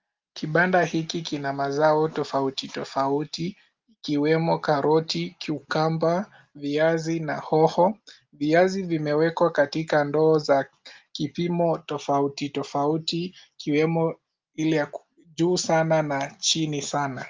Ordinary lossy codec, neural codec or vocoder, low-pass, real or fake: Opus, 32 kbps; none; 7.2 kHz; real